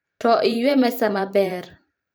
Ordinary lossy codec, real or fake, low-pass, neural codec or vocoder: none; fake; none; vocoder, 44.1 kHz, 128 mel bands every 512 samples, BigVGAN v2